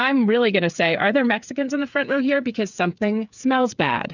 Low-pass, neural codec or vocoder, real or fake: 7.2 kHz; codec, 16 kHz, 8 kbps, FreqCodec, smaller model; fake